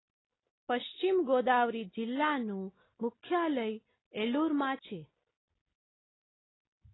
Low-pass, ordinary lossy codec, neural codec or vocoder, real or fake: 7.2 kHz; AAC, 16 kbps; none; real